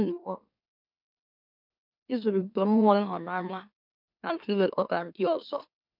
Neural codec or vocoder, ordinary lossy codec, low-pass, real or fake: autoencoder, 44.1 kHz, a latent of 192 numbers a frame, MeloTTS; none; 5.4 kHz; fake